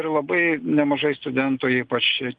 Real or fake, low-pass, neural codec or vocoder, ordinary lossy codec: real; 9.9 kHz; none; Opus, 32 kbps